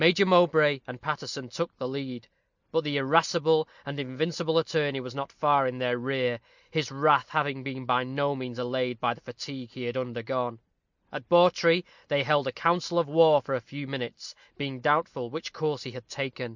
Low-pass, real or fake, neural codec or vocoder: 7.2 kHz; real; none